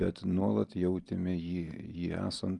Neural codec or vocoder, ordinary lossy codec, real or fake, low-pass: none; Opus, 32 kbps; real; 10.8 kHz